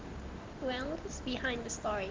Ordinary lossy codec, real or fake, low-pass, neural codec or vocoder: Opus, 16 kbps; real; 7.2 kHz; none